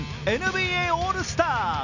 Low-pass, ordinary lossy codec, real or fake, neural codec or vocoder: 7.2 kHz; none; real; none